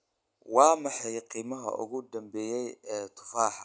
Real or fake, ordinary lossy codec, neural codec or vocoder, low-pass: real; none; none; none